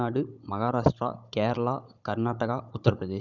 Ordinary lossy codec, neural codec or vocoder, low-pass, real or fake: none; codec, 16 kHz, 16 kbps, FunCodec, trained on Chinese and English, 50 frames a second; 7.2 kHz; fake